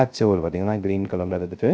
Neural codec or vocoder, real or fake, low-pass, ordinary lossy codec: codec, 16 kHz, 0.3 kbps, FocalCodec; fake; none; none